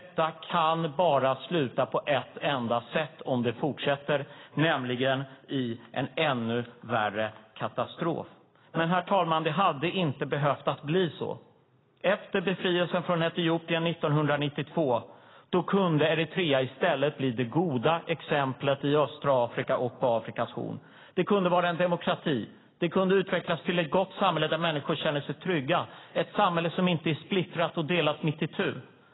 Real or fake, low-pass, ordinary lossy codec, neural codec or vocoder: real; 7.2 kHz; AAC, 16 kbps; none